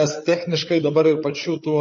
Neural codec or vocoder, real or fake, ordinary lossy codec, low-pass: codec, 16 kHz, 8 kbps, FreqCodec, larger model; fake; MP3, 32 kbps; 7.2 kHz